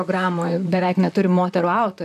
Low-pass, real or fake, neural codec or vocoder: 14.4 kHz; fake; vocoder, 44.1 kHz, 128 mel bands, Pupu-Vocoder